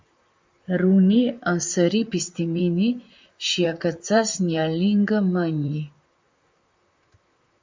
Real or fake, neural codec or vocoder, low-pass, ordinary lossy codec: fake; vocoder, 44.1 kHz, 128 mel bands, Pupu-Vocoder; 7.2 kHz; MP3, 64 kbps